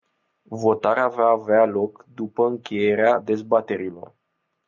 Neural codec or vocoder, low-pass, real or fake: none; 7.2 kHz; real